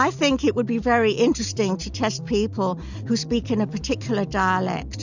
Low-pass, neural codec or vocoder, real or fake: 7.2 kHz; none; real